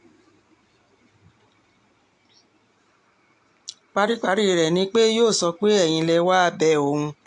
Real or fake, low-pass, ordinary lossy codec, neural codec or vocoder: real; 10.8 kHz; AAC, 64 kbps; none